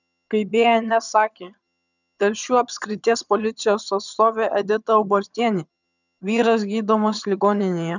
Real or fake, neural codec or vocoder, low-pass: fake; vocoder, 22.05 kHz, 80 mel bands, HiFi-GAN; 7.2 kHz